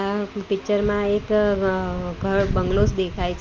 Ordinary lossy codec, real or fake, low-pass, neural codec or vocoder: Opus, 24 kbps; real; 7.2 kHz; none